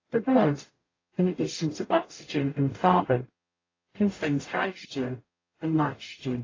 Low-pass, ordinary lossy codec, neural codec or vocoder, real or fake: 7.2 kHz; AAC, 32 kbps; codec, 44.1 kHz, 0.9 kbps, DAC; fake